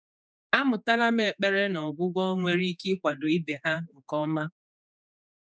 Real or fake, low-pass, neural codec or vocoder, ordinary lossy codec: fake; none; codec, 16 kHz, 4 kbps, X-Codec, HuBERT features, trained on general audio; none